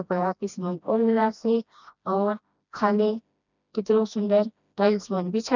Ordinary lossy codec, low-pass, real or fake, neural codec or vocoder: none; 7.2 kHz; fake; codec, 16 kHz, 1 kbps, FreqCodec, smaller model